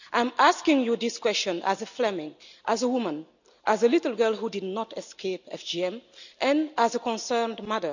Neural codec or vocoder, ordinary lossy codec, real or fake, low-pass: none; none; real; 7.2 kHz